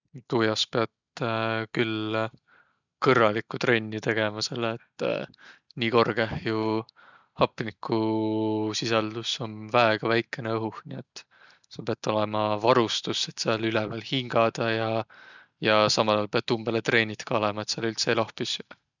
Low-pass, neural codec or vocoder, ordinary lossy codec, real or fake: 7.2 kHz; none; none; real